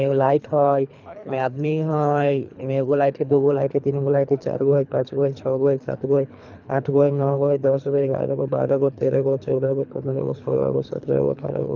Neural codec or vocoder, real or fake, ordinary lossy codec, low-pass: codec, 24 kHz, 3 kbps, HILCodec; fake; none; 7.2 kHz